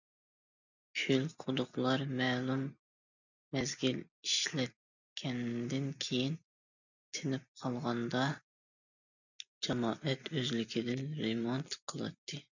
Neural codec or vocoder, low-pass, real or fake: none; 7.2 kHz; real